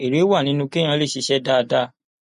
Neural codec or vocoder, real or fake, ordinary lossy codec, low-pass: none; real; MP3, 48 kbps; 14.4 kHz